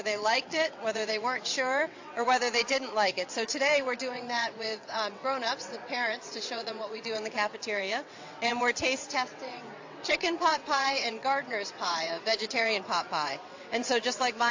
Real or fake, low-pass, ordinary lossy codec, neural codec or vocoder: fake; 7.2 kHz; AAC, 48 kbps; vocoder, 22.05 kHz, 80 mel bands, WaveNeXt